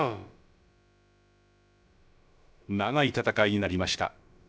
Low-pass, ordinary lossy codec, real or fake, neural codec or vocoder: none; none; fake; codec, 16 kHz, about 1 kbps, DyCAST, with the encoder's durations